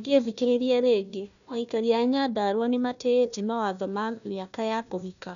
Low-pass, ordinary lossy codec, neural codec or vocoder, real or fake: 7.2 kHz; none; codec, 16 kHz, 1 kbps, FunCodec, trained on Chinese and English, 50 frames a second; fake